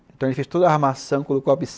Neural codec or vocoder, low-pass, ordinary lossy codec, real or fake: none; none; none; real